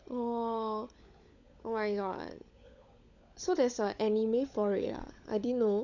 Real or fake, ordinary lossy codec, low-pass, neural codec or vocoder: fake; none; 7.2 kHz; codec, 16 kHz, 16 kbps, FunCodec, trained on LibriTTS, 50 frames a second